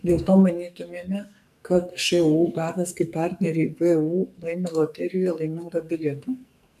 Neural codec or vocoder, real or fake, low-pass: codec, 44.1 kHz, 2.6 kbps, SNAC; fake; 14.4 kHz